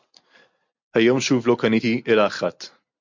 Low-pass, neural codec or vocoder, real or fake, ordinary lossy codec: 7.2 kHz; none; real; MP3, 48 kbps